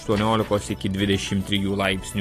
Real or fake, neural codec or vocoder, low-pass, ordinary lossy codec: real; none; 14.4 kHz; AAC, 48 kbps